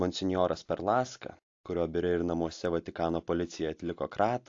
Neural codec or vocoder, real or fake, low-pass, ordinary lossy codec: none; real; 7.2 kHz; AAC, 48 kbps